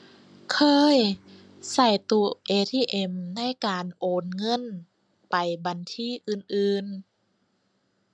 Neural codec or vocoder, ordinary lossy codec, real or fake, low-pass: none; MP3, 96 kbps; real; 9.9 kHz